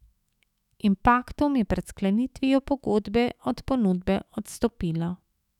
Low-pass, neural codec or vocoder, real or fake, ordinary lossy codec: 19.8 kHz; autoencoder, 48 kHz, 128 numbers a frame, DAC-VAE, trained on Japanese speech; fake; none